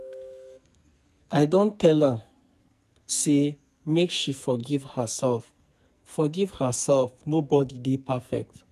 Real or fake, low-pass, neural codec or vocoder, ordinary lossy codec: fake; 14.4 kHz; codec, 44.1 kHz, 2.6 kbps, SNAC; none